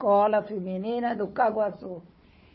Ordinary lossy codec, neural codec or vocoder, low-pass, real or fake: MP3, 24 kbps; codec, 16 kHz, 4 kbps, FunCodec, trained on Chinese and English, 50 frames a second; 7.2 kHz; fake